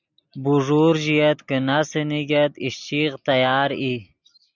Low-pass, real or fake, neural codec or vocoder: 7.2 kHz; real; none